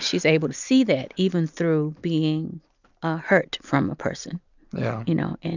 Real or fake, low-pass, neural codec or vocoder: real; 7.2 kHz; none